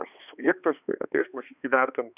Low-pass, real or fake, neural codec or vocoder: 3.6 kHz; fake; codec, 16 kHz, 2 kbps, X-Codec, HuBERT features, trained on balanced general audio